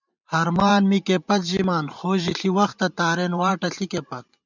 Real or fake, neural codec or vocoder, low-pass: fake; vocoder, 44.1 kHz, 128 mel bands every 512 samples, BigVGAN v2; 7.2 kHz